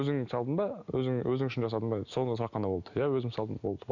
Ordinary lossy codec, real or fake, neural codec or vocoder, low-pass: none; real; none; 7.2 kHz